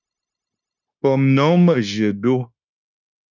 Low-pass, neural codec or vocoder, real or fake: 7.2 kHz; codec, 16 kHz, 0.9 kbps, LongCat-Audio-Codec; fake